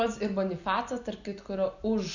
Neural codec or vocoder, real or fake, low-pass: none; real; 7.2 kHz